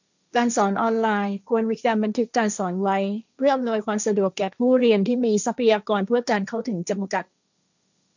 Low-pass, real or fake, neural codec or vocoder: 7.2 kHz; fake; codec, 16 kHz, 1.1 kbps, Voila-Tokenizer